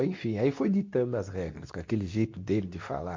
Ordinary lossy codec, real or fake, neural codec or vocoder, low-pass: MP3, 48 kbps; fake; codec, 24 kHz, 0.9 kbps, WavTokenizer, medium speech release version 2; 7.2 kHz